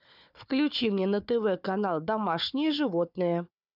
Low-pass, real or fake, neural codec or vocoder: 5.4 kHz; fake; codec, 16 kHz, 4 kbps, FunCodec, trained on Chinese and English, 50 frames a second